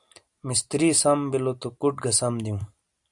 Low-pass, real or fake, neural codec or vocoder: 10.8 kHz; real; none